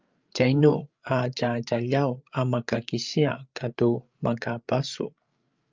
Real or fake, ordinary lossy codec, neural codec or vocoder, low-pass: fake; Opus, 24 kbps; codec, 16 kHz, 8 kbps, FreqCodec, larger model; 7.2 kHz